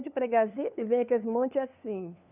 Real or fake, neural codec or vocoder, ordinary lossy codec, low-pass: fake; codec, 16 kHz, 2 kbps, FunCodec, trained on LibriTTS, 25 frames a second; none; 3.6 kHz